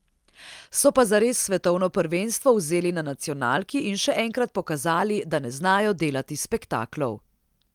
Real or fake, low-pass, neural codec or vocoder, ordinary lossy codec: real; 19.8 kHz; none; Opus, 32 kbps